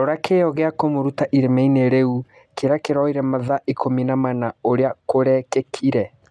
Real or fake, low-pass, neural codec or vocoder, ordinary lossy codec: real; none; none; none